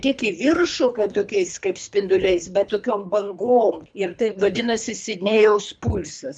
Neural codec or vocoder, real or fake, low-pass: codec, 24 kHz, 3 kbps, HILCodec; fake; 9.9 kHz